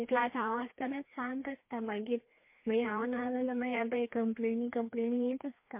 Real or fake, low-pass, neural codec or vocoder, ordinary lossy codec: fake; 3.6 kHz; codec, 16 kHz, 2 kbps, FreqCodec, larger model; MP3, 24 kbps